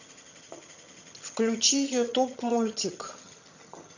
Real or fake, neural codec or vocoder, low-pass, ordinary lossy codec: fake; vocoder, 22.05 kHz, 80 mel bands, HiFi-GAN; 7.2 kHz; none